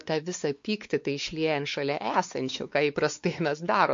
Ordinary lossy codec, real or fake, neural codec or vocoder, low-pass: MP3, 48 kbps; fake; codec, 16 kHz, 4 kbps, X-Codec, WavLM features, trained on Multilingual LibriSpeech; 7.2 kHz